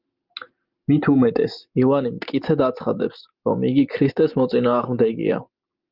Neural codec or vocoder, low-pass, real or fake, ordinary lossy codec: none; 5.4 kHz; real; Opus, 32 kbps